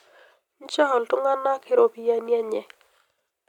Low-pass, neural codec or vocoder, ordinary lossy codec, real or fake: 19.8 kHz; none; none; real